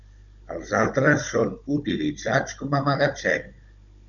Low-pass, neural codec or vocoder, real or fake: 7.2 kHz; codec, 16 kHz, 16 kbps, FunCodec, trained on Chinese and English, 50 frames a second; fake